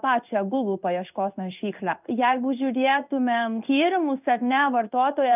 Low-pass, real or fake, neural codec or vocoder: 3.6 kHz; fake; codec, 16 kHz in and 24 kHz out, 1 kbps, XY-Tokenizer